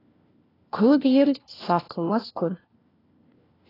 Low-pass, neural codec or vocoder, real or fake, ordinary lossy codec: 5.4 kHz; codec, 16 kHz, 1 kbps, FunCodec, trained on LibriTTS, 50 frames a second; fake; AAC, 24 kbps